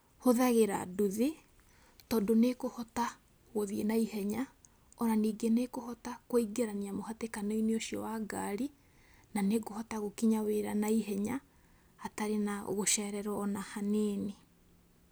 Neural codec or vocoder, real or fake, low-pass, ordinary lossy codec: none; real; none; none